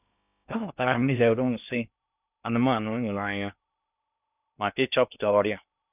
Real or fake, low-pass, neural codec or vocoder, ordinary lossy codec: fake; 3.6 kHz; codec, 16 kHz in and 24 kHz out, 0.6 kbps, FocalCodec, streaming, 2048 codes; none